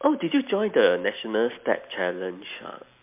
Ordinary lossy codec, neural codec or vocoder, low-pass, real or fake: MP3, 32 kbps; none; 3.6 kHz; real